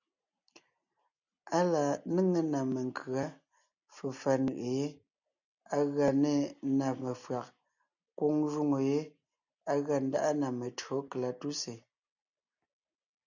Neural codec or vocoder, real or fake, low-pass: none; real; 7.2 kHz